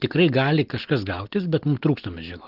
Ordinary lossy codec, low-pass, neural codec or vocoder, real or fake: Opus, 16 kbps; 5.4 kHz; none; real